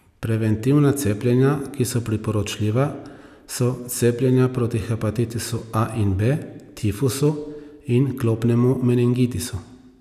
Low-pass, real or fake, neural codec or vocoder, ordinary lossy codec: 14.4 kHz; real; none; none